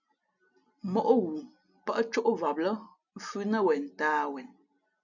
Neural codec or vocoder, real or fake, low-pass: none; real; 7.2 kHz